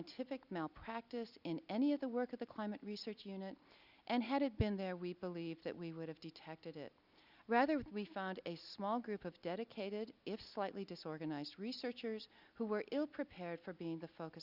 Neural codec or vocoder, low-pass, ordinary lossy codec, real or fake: none; 5.4 kHz; Opus, 64 kbps; real